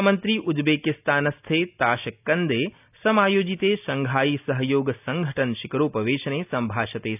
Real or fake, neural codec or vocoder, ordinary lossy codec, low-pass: real; none; none; 3.6 kHz